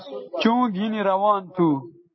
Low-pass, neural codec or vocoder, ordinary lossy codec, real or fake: 7.2 kHz; none; MP3, 24 kbps; real